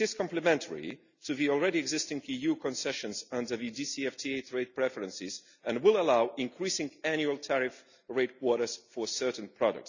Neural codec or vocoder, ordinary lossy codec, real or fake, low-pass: none; none; real; 7.2 kHz